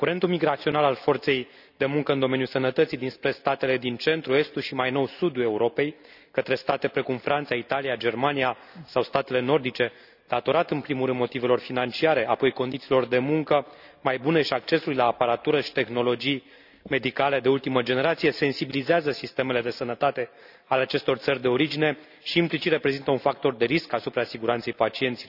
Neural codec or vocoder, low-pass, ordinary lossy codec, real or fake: none; 5.4 kHz; none; real